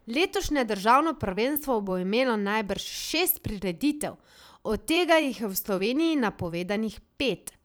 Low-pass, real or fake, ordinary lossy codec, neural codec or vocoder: none; fake; none; vocoder, 44.1 kHz, 128 mel bands every 512 samples, BigVGAN v2